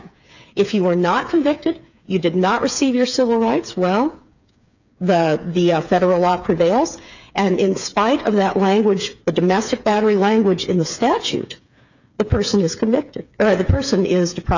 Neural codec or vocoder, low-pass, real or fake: codec, 16 kHz, 8 kbps, FreqCodec, smaller model; 7.2 kHz; fake